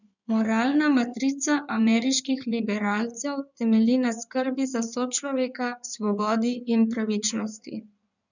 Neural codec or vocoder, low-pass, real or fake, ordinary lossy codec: codec, 16 kHz in and 24 kHz out, 2.2 kbps, FireRedTTS-2 codec; 7.2 kHz; fake; none